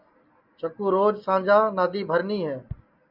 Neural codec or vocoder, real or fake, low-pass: none; real; 5.4 kHz